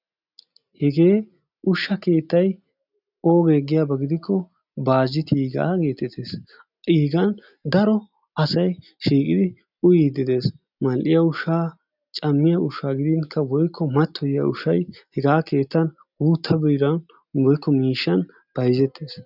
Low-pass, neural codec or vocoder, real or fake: 5.4 kHz; none; real